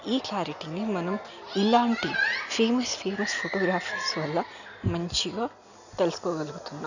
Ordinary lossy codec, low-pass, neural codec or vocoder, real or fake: none; 7.2 kHz; none; real